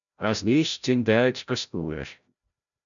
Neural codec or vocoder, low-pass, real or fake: codec, 16 kHz, 0.5 kbps, FreqCodec, larger model; 7.2 kHz; fake